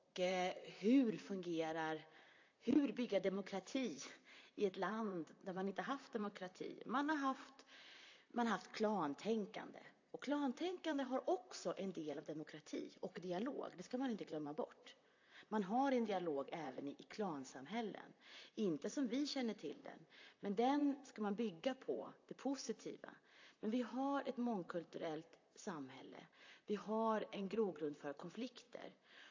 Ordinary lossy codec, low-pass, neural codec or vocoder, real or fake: AAC, 48 kbps; 7.2 kHz; vocoder, 44.1 kHz, 128 mel bands, Pupu-Vocoder; fake